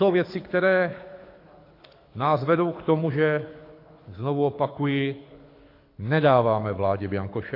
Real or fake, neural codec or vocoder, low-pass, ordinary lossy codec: fake; codec, 24 kHz, 6 kbps, HILCodec; 5.4 kHz; AAC, 32 kbps